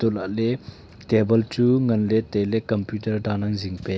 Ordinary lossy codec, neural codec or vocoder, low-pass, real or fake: none; none; none; real